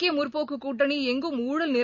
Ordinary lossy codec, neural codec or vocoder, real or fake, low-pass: none; none; real; 7.2 kHz